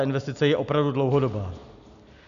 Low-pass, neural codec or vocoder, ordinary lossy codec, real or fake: 7.2 kHz; none; AAC, 96 kbps; real